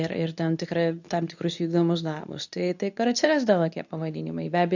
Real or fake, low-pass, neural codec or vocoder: fake; 7.2 kHz; codec, 24 kHz, 0.9 kbps, WavTokenizer, medium speech release version 2